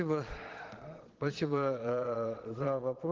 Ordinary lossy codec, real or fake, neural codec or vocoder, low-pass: Opus, 16 kbps; fake; vocoder, 22.05 kHz, 80 mel bands, Vocos; 7.2 kHz